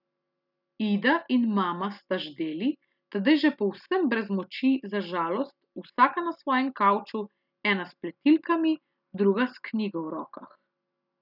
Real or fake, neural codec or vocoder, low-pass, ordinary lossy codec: real; none; 5.4 kHz; none